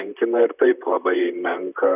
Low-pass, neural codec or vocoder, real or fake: 3.6 kHz; vocoder, 44.1 kHz, 128 mel bands, Pupu-Vocoder; fake